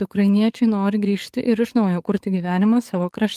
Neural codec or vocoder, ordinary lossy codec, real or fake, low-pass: codec, 44.1 kHz, 7.8 kbps, DAC; Opus, 32 kbps; fake; 14.4 kHz